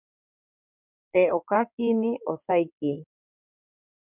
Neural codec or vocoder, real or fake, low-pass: codec, 16 kHz in and 24 kHz out, 2.2 kbps, FireRedTTS-2 codec; fake; 3.6 kHz